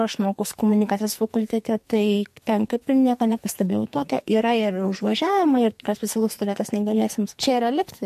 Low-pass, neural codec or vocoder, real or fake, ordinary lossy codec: 14.4 kHz; codec, 44.1 kHz, 2.6 kbps, SNAC; fake; MP3, 64 kbps